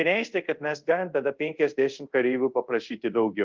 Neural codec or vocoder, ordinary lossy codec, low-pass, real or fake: codec, 24 kHz, 0.5 kbps, DualCodec; Opus, 32 kbps; 7.2 kHz; fake